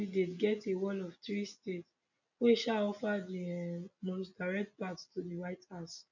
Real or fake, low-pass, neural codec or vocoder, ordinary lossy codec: real; 7.2 kHz; none; none